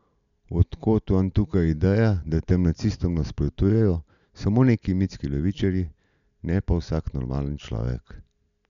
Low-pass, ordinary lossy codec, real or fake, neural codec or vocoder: 7.2 kHz; none; real; none